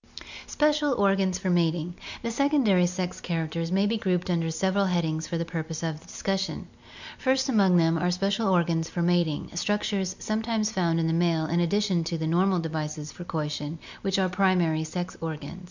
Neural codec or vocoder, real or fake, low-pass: none; real; 7.2 kHz